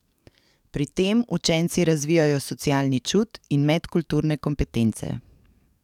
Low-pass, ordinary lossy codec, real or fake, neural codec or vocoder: 19.8 kHz; none; fake; codec, 44.1 kHz, 7.8 kbps, DAC